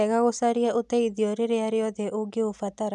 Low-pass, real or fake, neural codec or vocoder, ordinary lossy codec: none; real; none; none